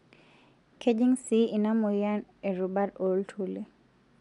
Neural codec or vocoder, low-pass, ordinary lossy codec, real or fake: none; 10.8 kHz; none; real